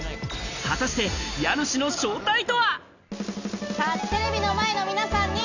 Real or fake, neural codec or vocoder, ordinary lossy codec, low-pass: real; none; none; 7.2 kHz